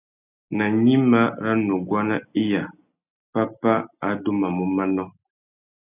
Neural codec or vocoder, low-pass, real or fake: none; 3.6 kHz; real